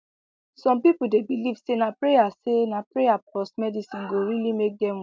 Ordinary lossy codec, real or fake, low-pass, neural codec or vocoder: none; real; none; none